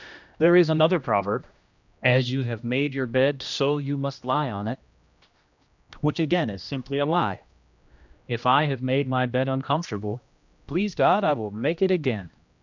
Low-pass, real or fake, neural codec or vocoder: 7.2 kHz; fake; codec, 16 kHz, 1 kbps, X-Codec, HuBERT features, trained on general audio